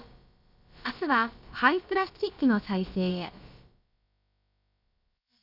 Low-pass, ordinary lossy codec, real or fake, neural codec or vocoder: 5.4 kHz; none; fake; codec, 16 kHz, about 1 kbps, DyCAST, with the encoder's durations